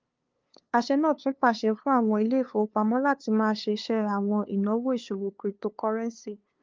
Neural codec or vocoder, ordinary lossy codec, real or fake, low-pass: codec, 16 kHz, 2 kbps, FunCodec, trained on LibriTTS, 25 frames a second; Opus, 24 kbps; fake; 7.2 kHz